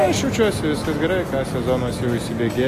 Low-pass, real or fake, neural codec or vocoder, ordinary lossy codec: 14.4 kHz; real; none; AAC, 48 kbps